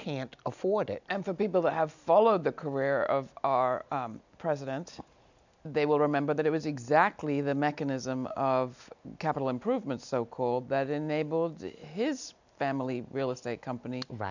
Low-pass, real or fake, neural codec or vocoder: 7.2 kHz; real; none